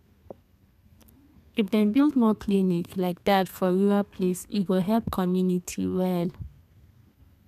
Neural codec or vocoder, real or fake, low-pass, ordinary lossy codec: codec, 32 kHz, 1.9 kbps, SNAC; fake; 14.4 kHz; none